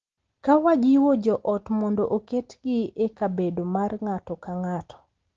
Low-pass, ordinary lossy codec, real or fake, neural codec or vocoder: 7.2 kHz; Opus, 16 kbps; real; none